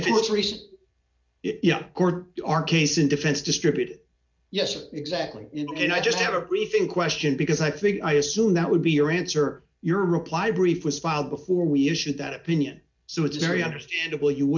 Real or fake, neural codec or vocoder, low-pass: real; none; 7.2 kHz